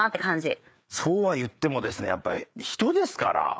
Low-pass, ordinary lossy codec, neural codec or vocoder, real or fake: none; none; codec, 16 kHz, 4 kbps, FreqCodec, larger model; fake